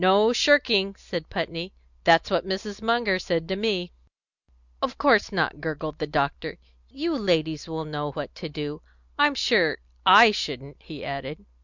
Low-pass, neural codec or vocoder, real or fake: 7.2 kHz; none; real